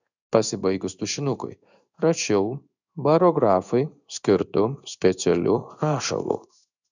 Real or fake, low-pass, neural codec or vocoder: fake; 7.2 kHz; codec, 16 kHz in and 24 kHz out, 1 kbps, XY-Tokenizer